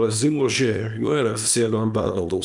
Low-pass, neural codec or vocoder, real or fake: 10.8 kHz; codec, 24 kHz, 0.9 kbps, WavTokenizer, small release; fake